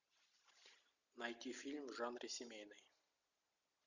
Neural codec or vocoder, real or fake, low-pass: none; real; 7.2 kHz